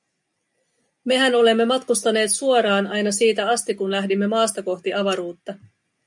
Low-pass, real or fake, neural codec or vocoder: 10.8 kHz; real; none